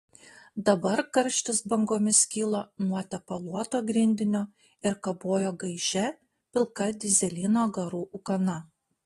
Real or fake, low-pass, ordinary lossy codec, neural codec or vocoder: real; 14.4 kHz; AAC, 32 kbps; none